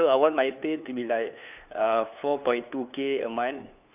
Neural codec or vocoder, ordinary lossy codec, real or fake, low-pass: codec, 16 kHz, 2 kbps, FunCodec, trained on LibriTTS, 25 frames a second; none; fake; 3.6 kHz